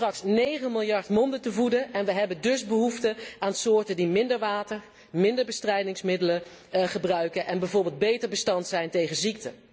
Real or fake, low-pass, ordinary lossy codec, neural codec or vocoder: real; none; none; none